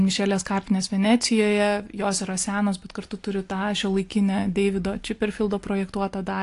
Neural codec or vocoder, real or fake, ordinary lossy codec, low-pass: none; real; AAC, 48 kbps; 10.8 kHz